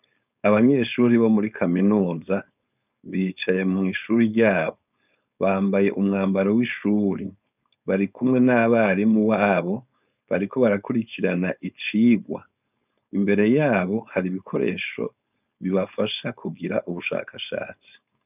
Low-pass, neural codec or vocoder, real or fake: 3.6 kHz; codec, 16 kHz, 4.8 kbps, FACodec; fake